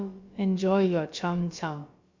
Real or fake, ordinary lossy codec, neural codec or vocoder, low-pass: fake; MP3, 48 kbps; codec, 16 kHz, about 1 kbps, DyCAST, with the encoder's durations; 7.2 kHz